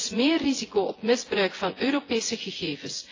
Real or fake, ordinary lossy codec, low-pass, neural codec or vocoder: fake; AAC, 32 kbps; 7.2 kHz; vocoder, 24 kHz, 100 mel bands, Vocos